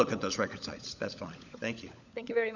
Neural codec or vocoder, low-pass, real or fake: codec, 16 kHz, 16 kbps, FunCodec, trained on LibriTTS, 50 frames a second; 7.2 kHz; fake